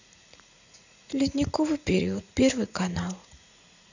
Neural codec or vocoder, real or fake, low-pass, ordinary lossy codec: vocoder, 44.1 kHz, 80 mel bands, Vocos; fake; 7.2 kHz; none